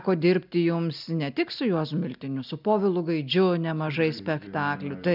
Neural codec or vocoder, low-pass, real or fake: none; 5.4 kHz; real